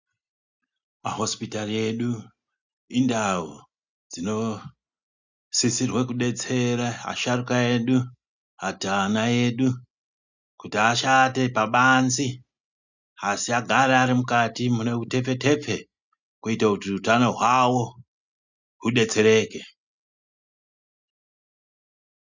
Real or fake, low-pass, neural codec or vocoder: real; 7.2 kHz; none